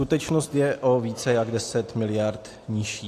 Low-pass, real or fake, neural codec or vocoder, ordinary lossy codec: 14.4 kHz; real; none; AAC, 64 kbps